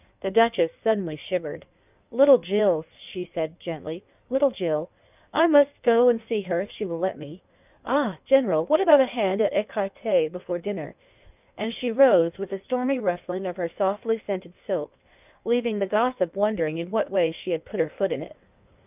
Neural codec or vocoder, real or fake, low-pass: codec, 16 kHz in and 24 kHz out, 1.1 kbps, FireRedTTS-2 codec; fake; 3.6 kHz